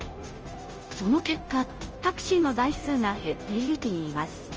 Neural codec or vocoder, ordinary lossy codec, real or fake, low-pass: codec, 16 kHz, 0.5 kbps, FunCodec, trained on Chinese and English, 25 frames a second; Opus, 24 kbps; fake; 7.2 kHz